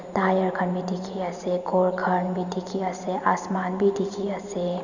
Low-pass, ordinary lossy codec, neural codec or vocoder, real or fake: 7.2 kHz; none; vocoder, 44.1 kHz, 128 mel bands every 512 samples, BigVGAN v2; fake